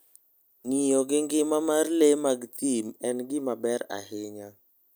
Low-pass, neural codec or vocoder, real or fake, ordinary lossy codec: none; none; real; none